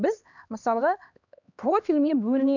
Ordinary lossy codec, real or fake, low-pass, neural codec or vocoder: none; fake; 7.2 kHz; codec, 16 kHz, 2 kbps, X-Codec, HuBERT features, trained on LibriSpeech